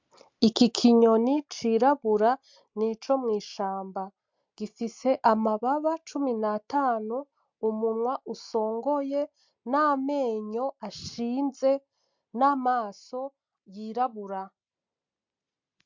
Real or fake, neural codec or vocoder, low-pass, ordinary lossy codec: real; none; 7.2 kHz; MP3, 64 kbps